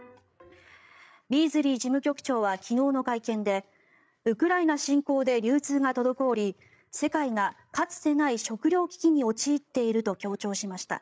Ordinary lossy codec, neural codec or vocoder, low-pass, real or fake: none; codec, 16 kHz, 8 kbps, FreqCodec, larger model; none; fake